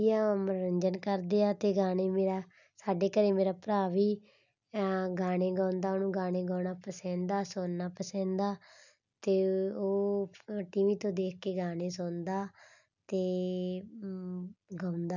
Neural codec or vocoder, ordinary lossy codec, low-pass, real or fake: none; none; 7.2 kHz; real